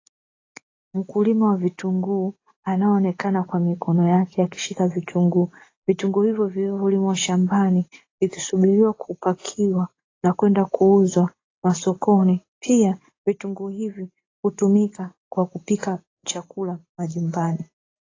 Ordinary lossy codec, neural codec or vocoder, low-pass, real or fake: AAC, 32 kbps; none; 7.2 kHz; real